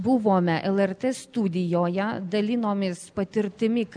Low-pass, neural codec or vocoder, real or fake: 9.9 kHz; none; real